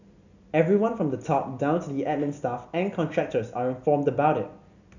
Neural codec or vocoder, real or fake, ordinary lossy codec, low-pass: none; real; none; 7.2 kHz